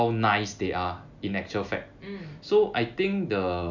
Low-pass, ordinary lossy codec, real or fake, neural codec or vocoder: 7.2 kHz; none; real; none